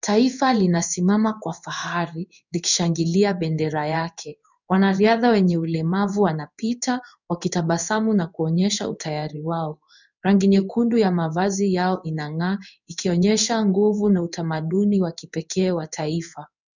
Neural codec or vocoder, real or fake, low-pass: codec, 16 kHz in and 24 kHz out, 1 kbps, XY-Tokenizer; fake; 7.2 kHz